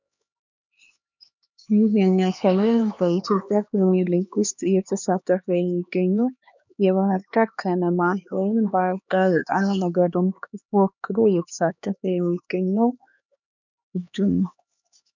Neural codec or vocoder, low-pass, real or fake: codec, 16 kHz, 2 kbps, X-Codec, HuBERT features, trained on LibriSpeech; 7.2 kHz; fake